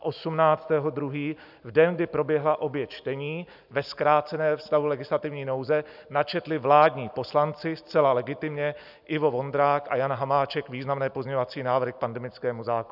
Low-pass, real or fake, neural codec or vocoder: 5.4 kHz; real; none